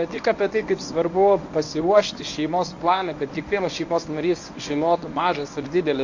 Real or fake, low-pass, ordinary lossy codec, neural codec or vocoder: fake; 7.2 kHz; AAC, 48 kbps; codec, 24 kHz, 0.9 kbps, WavTokenizer, medium speech release version 1